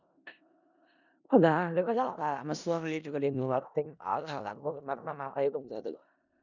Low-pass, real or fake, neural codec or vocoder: 7.2 kHz; fake; codec, 16 kHz in and 24 kHz out, 0.4 kbps, LongCat-Audio-Codec, four codebook decoder